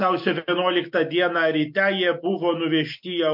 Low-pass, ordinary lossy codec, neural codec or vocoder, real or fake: 5.4 kHz; MP3, 48 kbps; none; real